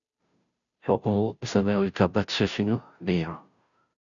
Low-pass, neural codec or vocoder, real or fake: 7.2 kHz; codec, 16 kHz, 0.5 kbps, FunCodec, trained on Chinese and English, 25 frames a second; fake